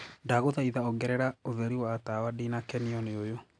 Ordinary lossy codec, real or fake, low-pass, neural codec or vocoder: none; real; 9.9 kHz; none